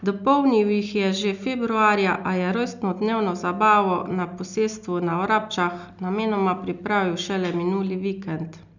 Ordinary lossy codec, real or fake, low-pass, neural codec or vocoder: none; real; 7.2 kHz; none